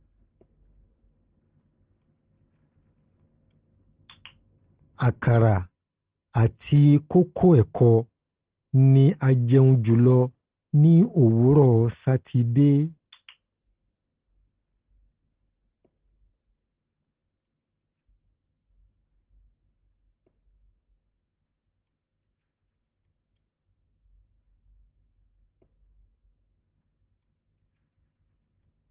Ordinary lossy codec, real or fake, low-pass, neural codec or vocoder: Opus, 16 kbps; real; 3.6 kHz; none